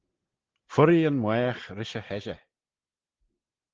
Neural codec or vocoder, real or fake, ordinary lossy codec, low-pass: none; real; Opus, 16 kbps; 7.2 kHz